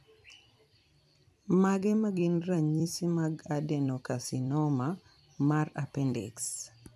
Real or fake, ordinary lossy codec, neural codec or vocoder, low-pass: fake; none; vocoder, 44.1 kHz, 128 mel bands every 256 samples, BigVGAN v2; 14.4 kHz